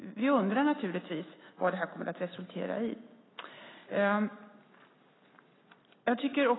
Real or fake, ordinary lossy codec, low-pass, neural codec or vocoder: real; AAC, 16 kbps; 7.2 kHz; none